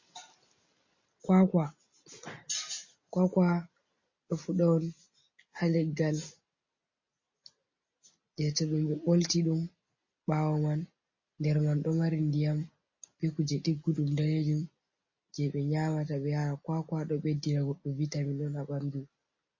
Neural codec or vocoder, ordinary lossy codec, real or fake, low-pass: none; MP3, 32 kbps; real; 7.2 kHz